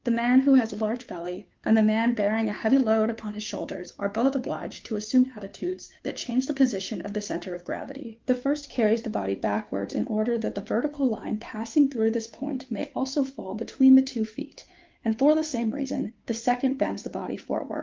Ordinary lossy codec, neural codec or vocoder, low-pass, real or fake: Opus, 32 kbps; codec, 16 kHz, 2 kbps, FunCodec, trained on Chinese and English, 25 frames a second; 7.2 kHz; fake